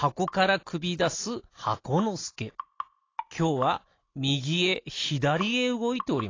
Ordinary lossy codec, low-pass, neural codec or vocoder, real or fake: AAC, 32 kbps; 7.2 kHz; none; real